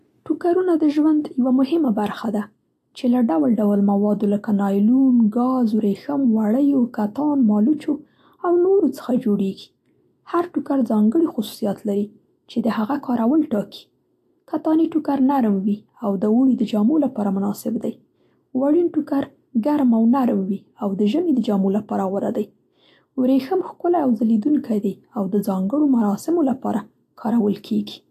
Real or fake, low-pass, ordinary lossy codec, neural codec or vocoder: real; 14.4 kHz; AAC, 64 kbps; none